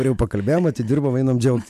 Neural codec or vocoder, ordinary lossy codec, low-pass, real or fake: none; MP3, 96 kbps; 14.4 kHz; real